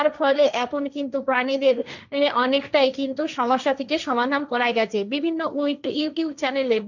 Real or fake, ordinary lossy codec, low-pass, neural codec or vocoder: fake; none; 7.2 kHz; codec, 16 kHz, 1.1 kbps, Voila-Tokenizer